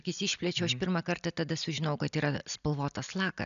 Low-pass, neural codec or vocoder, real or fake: 7.2 kHz; none; real